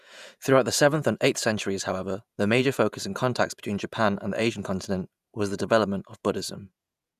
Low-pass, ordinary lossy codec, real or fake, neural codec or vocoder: 14.4 kHz; none; fake; vocoder, 48 kHz, 128 mel bands, Vocos